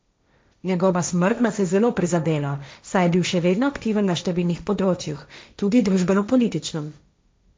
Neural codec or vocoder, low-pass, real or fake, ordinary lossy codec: codec, 16 kHz, 1.1 kbps, Voila-Tokenizer; none; fake; none